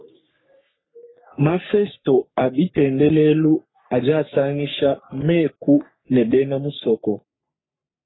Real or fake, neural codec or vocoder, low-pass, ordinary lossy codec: fake; codec, 44.1 kHz, 3.4 kbps, Pupu-Codec; 7.2 kHz; AAC, 16 kbps